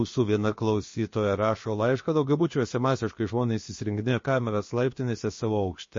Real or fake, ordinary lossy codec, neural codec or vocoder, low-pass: fake; MP3, 32 kbps; codec, 16 kHz, about 1 kbps, DyCAST, with the encoder's durations; 7.2 kHz